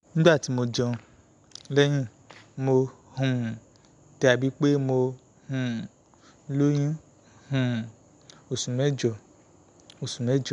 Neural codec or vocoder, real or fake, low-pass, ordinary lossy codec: none; real; 10.8 kHz; none